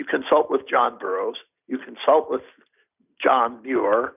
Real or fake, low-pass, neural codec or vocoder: real; 3.6 kHz; none